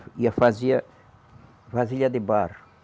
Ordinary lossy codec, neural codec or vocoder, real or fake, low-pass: none; none; real; none